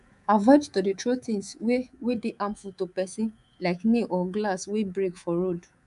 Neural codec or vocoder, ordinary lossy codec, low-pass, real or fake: codec, 24 kHz, 3.1 kbps, DualCodec; none; 10.8 kHz; fake